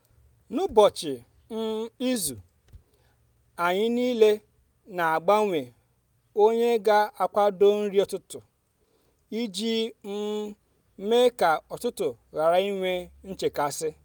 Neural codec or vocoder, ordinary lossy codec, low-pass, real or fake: none; none; none; real